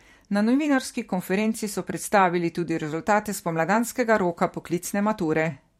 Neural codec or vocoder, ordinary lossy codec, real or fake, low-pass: vocoder, 44.1 kHz, 128 mel bands every 512 samples, BigVGAN v2; MP3, 64 kbps; fake; 19.8 kHz